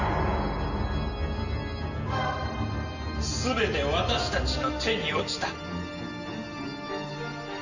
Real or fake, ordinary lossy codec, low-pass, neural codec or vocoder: real; none; 7.2 kHz; none